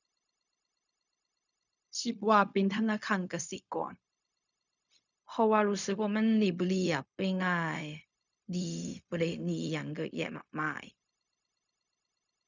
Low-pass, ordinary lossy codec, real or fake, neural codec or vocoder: 7.2 kHz; none; fake; codec, 16 kHz, 0.4 kbps, LongCat-Audio-Codec